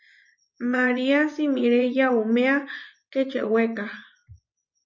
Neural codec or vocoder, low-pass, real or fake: vocoder, 24 kHz, 100 mel bands, Vocos; 7.2 kHz; fake